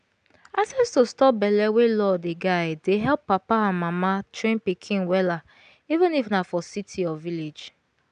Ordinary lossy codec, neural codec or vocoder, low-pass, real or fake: none; none; 9.9 kHz; real